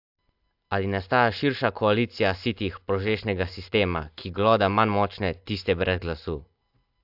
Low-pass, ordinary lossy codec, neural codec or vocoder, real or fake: 5.4 kHz; AAC, 48 kbps; none; real